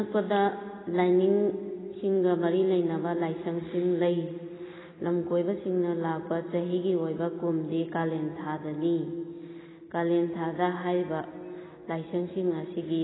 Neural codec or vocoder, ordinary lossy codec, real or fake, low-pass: none; AAC, 16 kbps; real; 7.2 kHz